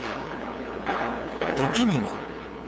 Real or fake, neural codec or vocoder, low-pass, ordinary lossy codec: fake; codec, 16 kHz, 2 kbps, FunCodec, trained on LibriTTS, 25 frames a second; none; none